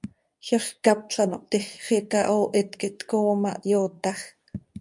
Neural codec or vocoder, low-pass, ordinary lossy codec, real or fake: codec, 24 kHz, 0.9 kbps, WavTokenizer, medium speech release version 2; 10.8 kHz; MP3, 96 kbps; fake